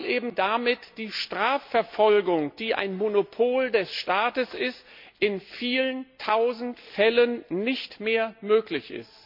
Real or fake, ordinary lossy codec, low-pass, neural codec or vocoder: real; MP3, 48 kbps; 5.4 kHz; none